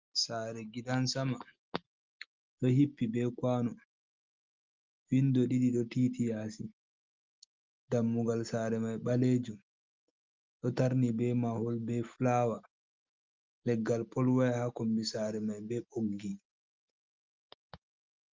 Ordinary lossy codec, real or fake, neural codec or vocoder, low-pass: Opus, 32 kbps; real; none; 7.2 kHz